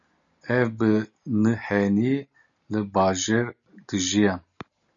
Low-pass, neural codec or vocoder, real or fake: 7.2 kHz; none; real